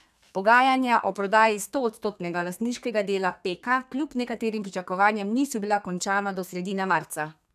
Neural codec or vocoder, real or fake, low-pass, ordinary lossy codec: codec, 32 kHz, 1.9 kbps, SNAC; fake; 14.4 kHz; none